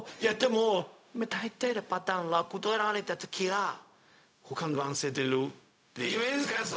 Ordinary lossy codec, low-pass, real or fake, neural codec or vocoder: none; none; fake; codec, 16 kHz, 0.4 kbps, LongCat-Audio-Codec